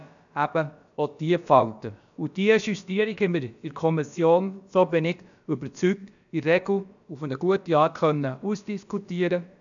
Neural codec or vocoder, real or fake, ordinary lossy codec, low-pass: codec, 16 kHz, about 1 kbps, DyCAST, with the encoder's durations; fake; none; 7.2 kHz